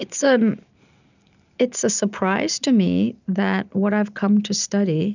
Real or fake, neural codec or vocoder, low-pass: real; none; 7.2 kHz